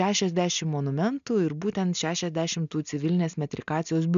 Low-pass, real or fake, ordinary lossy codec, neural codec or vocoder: 7.2 kHz; real; MP3, 96 kbps; none